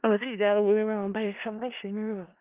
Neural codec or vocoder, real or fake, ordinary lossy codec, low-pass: codec, 16 kHz in and 24 kHz out, 0.4 kbps, LongCat-Audio-Codec, four codebook decoder; fake; Opus, 32 kbps; 3.6 kHz